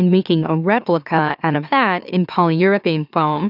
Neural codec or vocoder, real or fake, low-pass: autoencoder, 44.1 kHz, a latent of 192 numbers a frame, MeloTTS; fake; 5.4 kHz